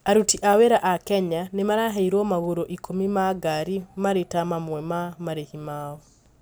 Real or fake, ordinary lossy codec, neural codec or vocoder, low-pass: real; none; none; none